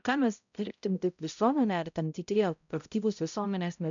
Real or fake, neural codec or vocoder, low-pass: fake; codec, 16 kHz, 0.5 kbps, X-Codec, HuBERT features, trained on balanced general audio; 7.2 kHz